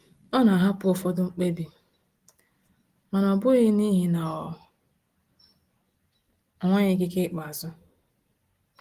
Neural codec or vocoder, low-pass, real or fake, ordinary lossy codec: none; 14.4 kHz; real; Opus, 24 kbps